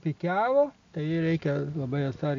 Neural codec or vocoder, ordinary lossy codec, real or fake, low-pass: none; AAC, 48 kbps; real; 7.2 kHz